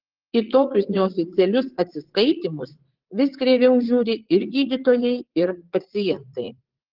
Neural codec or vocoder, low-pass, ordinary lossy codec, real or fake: vocoder, 44.1 kHz, 80 mel bands, Vocos; 5.4 kHz; Opus, 16 kbps; fake